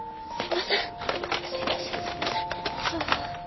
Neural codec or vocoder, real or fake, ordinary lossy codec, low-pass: none; real; MP3, 24 kbps; 7.2 kHz